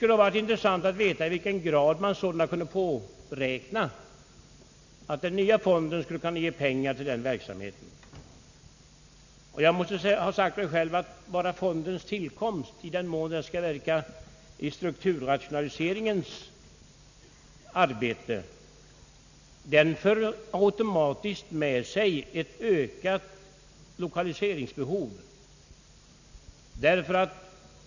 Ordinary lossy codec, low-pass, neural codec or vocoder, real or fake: none; 7.2 kHz; none; real